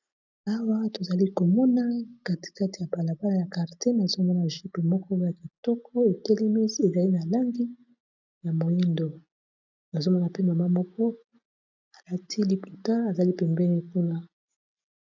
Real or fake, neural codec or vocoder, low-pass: real; none; 7.2 kHz